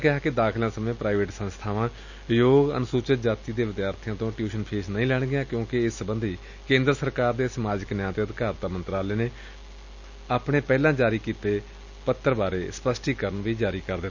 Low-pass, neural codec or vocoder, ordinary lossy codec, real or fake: 7.2 kHz; none; none; real